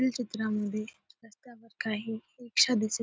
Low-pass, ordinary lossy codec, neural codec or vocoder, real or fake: none; none; none; real